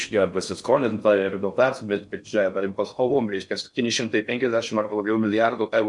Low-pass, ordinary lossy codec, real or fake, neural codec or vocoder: 10.8 kHz; MP3, 64 kbps; fake; codec, 16 kHz in and 24 kHz out, 0.6 kbps, FocalCodec, streaming, 4096 codes